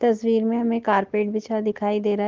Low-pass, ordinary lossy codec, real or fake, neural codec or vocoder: 7.2 kHz; Opus, 16 kbps; real; none